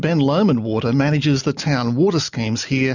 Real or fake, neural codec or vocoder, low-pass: real; none; 7.2 kHz